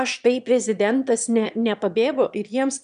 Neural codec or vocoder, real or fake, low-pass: autoencoder, 22.05 kHz, a latent of 192 numbers a frame, VITS, trained on one speaker; fake; 9.9 kHz